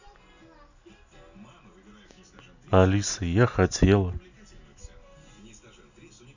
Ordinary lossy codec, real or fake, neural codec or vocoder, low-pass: none; real; none; 7.2 kHz